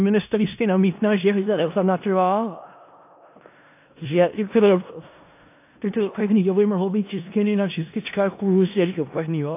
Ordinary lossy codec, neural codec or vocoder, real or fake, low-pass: AAC, 24 kbps; codec, 16 kHz in and 24 kHz out, 0.4 kbps, LongCat-Audio-Codec, four codebook decoder; fake; 3.6 kHz